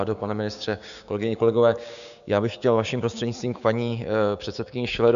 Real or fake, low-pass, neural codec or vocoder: fake; 7.2 kHz; codec, 16 kHz, 6 kbps, DAC